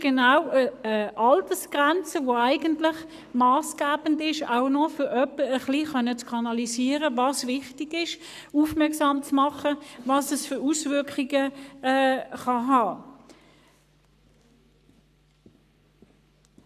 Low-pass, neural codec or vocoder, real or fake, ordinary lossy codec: 14.4 kHz; codec, 44.1 kHz, 7.8 kbps, Pupu-Codec; fake; none